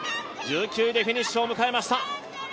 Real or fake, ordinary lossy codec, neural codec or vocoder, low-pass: real; none; none; none